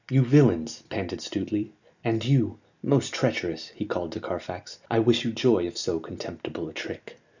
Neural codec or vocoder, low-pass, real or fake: none; 7.2 kHz; real